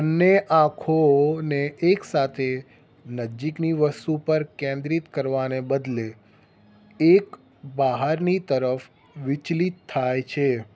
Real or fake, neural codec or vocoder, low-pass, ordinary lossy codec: real; none; none; none